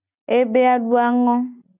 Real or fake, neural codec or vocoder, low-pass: real; none; 3.6 kHz